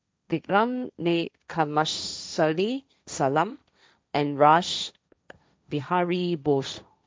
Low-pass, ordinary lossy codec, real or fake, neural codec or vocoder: none; none; fake; codec, 16 kHz, 1.1 kbps, Voila-Tokenizer